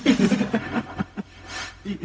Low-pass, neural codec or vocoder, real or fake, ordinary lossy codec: none; codec, 16 kHz, 0.4 kbps, LongCat-Audio-Codec; fake; none